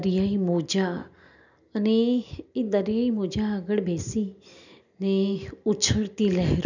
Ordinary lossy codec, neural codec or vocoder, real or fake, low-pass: none; none; real; 7.2 kHz